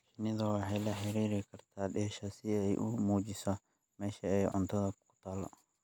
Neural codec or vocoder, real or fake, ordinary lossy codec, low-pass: none; real; none; none